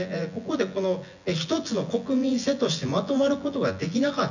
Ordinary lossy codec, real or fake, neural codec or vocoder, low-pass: none; fake; vocoder, 24 kHz, 100 mel bands, Vocos; 7.2 kHz